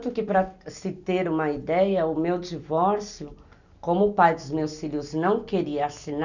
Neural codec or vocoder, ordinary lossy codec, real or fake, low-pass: none; none; real; 7.2 kHz